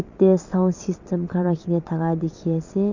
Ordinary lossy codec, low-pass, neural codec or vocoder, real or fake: none; 7.2 kHz; vocoder, 22.05 kHz, 80 mel bands, WaveNeXt; fake